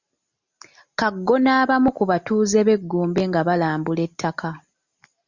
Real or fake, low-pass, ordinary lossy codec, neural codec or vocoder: real; 7.2 kHz; Opus, 64 kbps; none